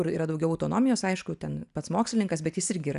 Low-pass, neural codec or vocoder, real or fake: 10.8 kHz; none; real